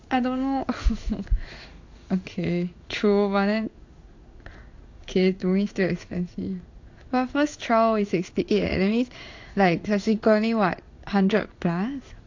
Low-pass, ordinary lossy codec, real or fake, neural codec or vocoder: 7.2 kHz; AAC, 48 kbps; fake; codec, 16 kHz in and 24 kHz out, 1 kbps, XY-Tokenizer